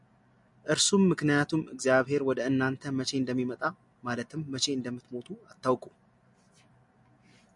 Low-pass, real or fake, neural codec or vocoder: 10.8 kHz; real; none